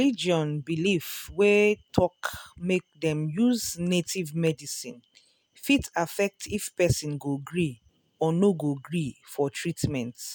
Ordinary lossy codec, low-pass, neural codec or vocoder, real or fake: none; none; none; real